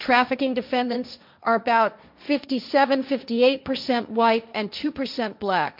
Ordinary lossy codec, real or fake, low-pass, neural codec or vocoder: MP3, 48 kbps; fake; 5.4 kHz; codec, 16 kHz, 1.1 kbps, Voila-Tokenizer